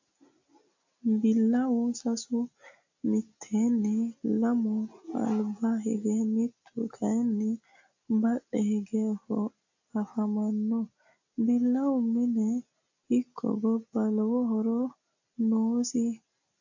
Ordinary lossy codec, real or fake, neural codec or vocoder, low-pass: AAC, 48 kbps; real; none; 7.2 kHz